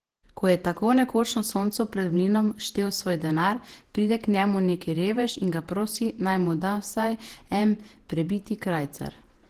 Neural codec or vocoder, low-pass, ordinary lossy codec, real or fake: vocoder, 48 kHz, 128 mel bands, Vocos; 14.4 kHz; Opus, 16 kbps; fake